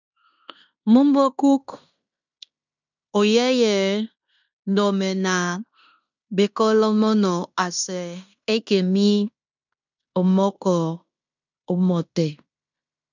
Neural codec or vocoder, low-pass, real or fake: codec, 16 kHz in and 24 kHz out, 0.9 kbps, LongCat-Audio-Codec, fine tuned four codebook decoder; 7.2 kHz; fake